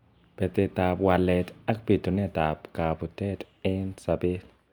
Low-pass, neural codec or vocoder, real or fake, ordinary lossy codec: 19.8 kHz; none; real; none